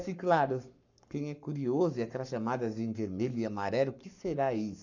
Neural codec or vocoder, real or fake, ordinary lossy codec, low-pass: codec, 44.1 kHz, 7.8 kbps, Pupu-Codec; fake; none; 7.2 kHz